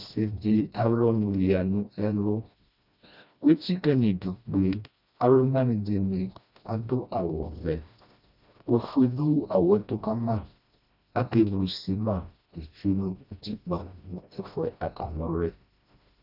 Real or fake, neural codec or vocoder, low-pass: fake; codec, 16 kHz, 1 kbps, FreqCodec, smaller model; 5.4 kHz